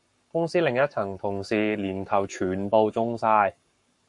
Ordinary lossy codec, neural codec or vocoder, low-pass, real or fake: MP3, 64 kbps; codec, 44.1 kHz, 7.8 kbps, Pupu-Codec; 10.8 kHz; fake